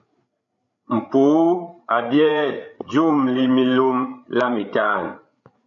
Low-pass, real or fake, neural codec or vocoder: 7.2 kHz; fake; codec, 16 kHz, 8 kbps, FreqCodec, larger model